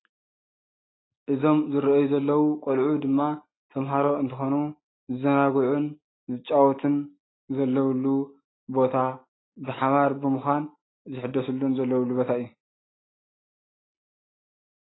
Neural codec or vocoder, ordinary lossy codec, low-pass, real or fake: none; AAC, 16 kbps; 7.2 kHz; real